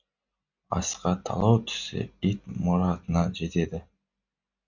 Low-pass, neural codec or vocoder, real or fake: 7.2 kHz; none; real